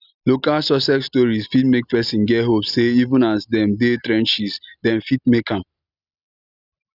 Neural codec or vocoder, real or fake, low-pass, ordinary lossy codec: none; real; 5.4 kHz; none